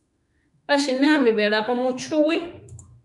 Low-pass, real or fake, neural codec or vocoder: 10.8 kHz; fake; autoencoder, 48 kHz, 32 numbers a frame, DAC-VAE, trained on Japanese speech